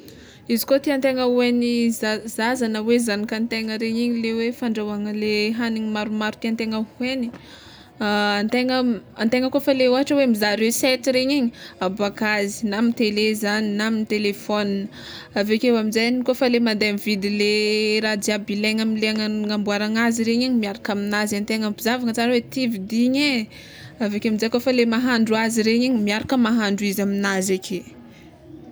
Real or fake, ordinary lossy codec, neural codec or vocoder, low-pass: real; none; none; none